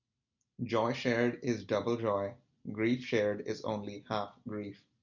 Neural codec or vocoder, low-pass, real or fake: none; 7.2 kHz; real